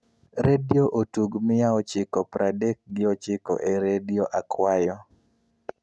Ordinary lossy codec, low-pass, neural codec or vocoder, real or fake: none; none; none; real